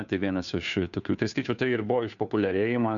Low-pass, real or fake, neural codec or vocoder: 7.2 kHz; fake; codec, 16 kHz, 2 kbps, FunCodec, trained on Chinese and English, 25 frames a second